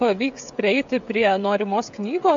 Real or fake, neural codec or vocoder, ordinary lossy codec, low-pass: fake; codec, 16 kHz, 16 kbps, FreqCodec, smaller model; AAC, 64 kbps; 7.2 kHz